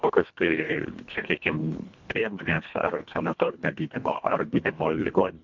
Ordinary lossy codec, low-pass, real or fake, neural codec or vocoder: MP3, 64 kbps; 7.2 kHz; fake; codec, 24 kHz, 1.5 kbps, HILCodec